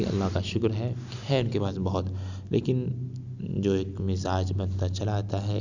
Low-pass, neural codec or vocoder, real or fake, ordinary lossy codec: 7.2 kHz; none; real; none